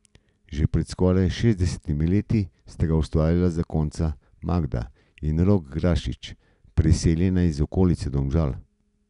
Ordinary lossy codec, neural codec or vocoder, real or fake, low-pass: none; none; real; 10.8 kHz